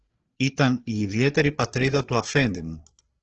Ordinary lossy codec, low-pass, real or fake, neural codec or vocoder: Opus, 16 kbps; 7.2 kHz; fake; codec, 16 kHz, 4 kbps, FreqCodec, larger model